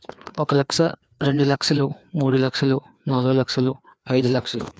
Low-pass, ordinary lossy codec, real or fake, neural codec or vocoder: none; none; fake; codec, 16 kHz, 2 kbps, FreqCodec, larger model